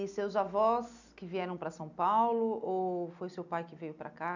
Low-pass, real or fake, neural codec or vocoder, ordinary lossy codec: 7.2 kHz; real; none; none